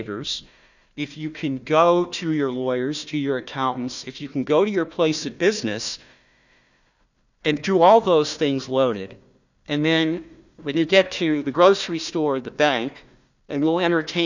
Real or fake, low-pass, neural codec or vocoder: fake; 7.2 kHz; codec, 16 kHz, 1 kbps, FunCodec, trained on Chinese and English, 50 frames a second